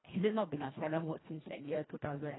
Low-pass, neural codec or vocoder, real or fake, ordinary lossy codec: 7.2 kHz; codec, 24 kHz, 1.5 kbps, HILCodec; fake; AAC, 16 kbps